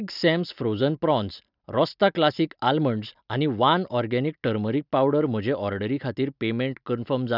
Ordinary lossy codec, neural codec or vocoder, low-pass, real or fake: none; none; 5.4 kHz; real